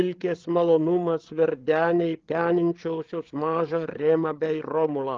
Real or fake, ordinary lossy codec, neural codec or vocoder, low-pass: fake; Opus, 16 kbps; codec, 16 kHz, 8 kbps, FreqCodec, larger model; 7.2 kHz